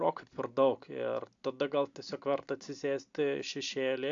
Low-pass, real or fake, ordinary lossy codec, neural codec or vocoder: 7.2 kHz; real; AAC, 64 kbps; none